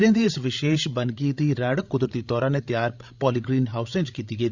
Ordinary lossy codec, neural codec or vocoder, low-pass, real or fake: Opus, 64 kbps; codec, 16 kHz, 16 kbps, FreqCodec, larger model; 7.2 kHz; fake